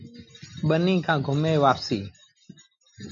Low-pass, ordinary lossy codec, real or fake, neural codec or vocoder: 7.2 kHz; MP3, 96 kbps; real; none